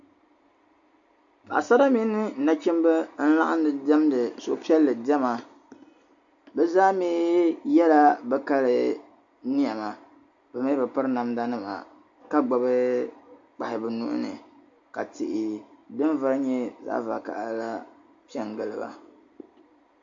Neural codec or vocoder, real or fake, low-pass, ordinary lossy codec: none; real; 7.2 kHz; AAC, 64 kbps